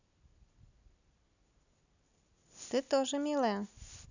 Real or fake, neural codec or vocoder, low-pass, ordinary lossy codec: real; none; 7.2 kHz; none